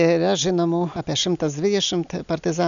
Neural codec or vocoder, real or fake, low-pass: none; real; 7.2 kHz